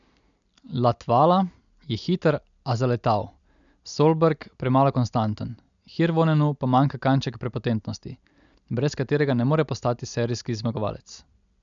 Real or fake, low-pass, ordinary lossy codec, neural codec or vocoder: real; 7.2 kHz; MP3, 96 kbps; none